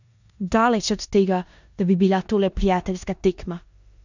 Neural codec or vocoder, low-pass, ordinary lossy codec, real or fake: codec, 16 kHz in and 24 kHz out, 0.9 kbps, LongCat-Audio-Codec, four codebook decoder; 7.2 kHz; none; fake